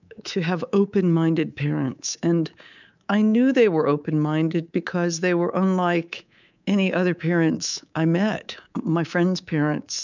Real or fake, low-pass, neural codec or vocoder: fake; 7.2 kHz; codec, 24 kHz, 3.1 kbps, DualCodec